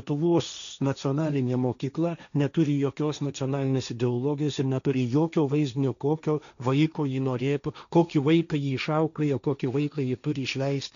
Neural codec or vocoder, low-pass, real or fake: codec, 16 kHz, 1.1 kbps, Voila-Tokenizer; 7.2 kHz; fake